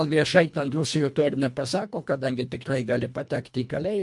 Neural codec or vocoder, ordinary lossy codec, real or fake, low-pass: codec, 24 kHz, 1.5 kbps, HILCodec; MP3, 64 kbps; fake; 10.8 kHz